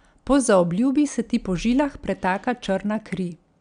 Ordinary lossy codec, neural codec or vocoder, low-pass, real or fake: none; none; 9.9 kHz; real